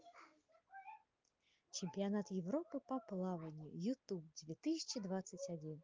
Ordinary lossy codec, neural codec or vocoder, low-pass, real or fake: Opus, 32 kbps; none; 7.2 kHz; real